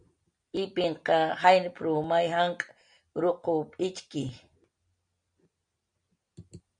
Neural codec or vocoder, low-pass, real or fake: none; 9.9 kHz; real